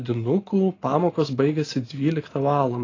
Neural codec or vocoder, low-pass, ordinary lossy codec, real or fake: none; 7.2 kHz; AAC, 32 kbps; real